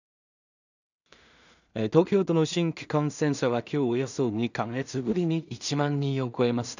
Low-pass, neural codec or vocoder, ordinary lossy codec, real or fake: 7.2 kHz; codec, 16 kHz in and 24 kHz out, 0.4 kbps, LongCat-Audio-Codec, two codebook decoder; none; fake